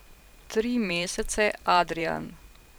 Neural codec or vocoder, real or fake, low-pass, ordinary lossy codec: vocoder, 44.1 kHz, 128 mel bands every 512 samples, BigVGAN v2; fake; none; none